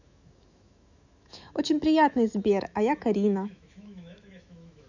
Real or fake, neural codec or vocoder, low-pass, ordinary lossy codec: fake; autoencoder, 48 kHz, 128 numbers a frame, DAC-VAE, trained on Japanese speech; 7.2 kHz; none